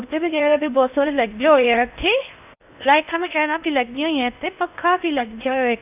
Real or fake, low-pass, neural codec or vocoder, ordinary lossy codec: fake; 3.6 kHz; codec, 16 kHz in and 24 kHz out, 0.6 kbps, FocalCodec, streaming, 2048 codes; none